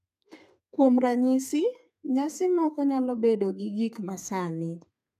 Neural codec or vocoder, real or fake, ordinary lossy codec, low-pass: codec, 32 kHz, 1.9 kbps, SNAC; fake; none; 14.4 kHz